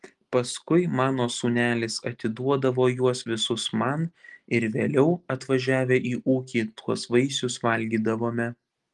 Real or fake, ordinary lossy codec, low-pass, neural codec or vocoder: real; Opus, 24 kbps; 10.8 kHz; none